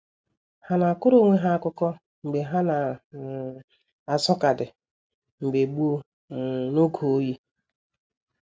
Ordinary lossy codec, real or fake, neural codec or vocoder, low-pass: none; real; none; none